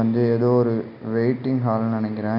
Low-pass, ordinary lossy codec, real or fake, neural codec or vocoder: 5.4 kHz; none; real; none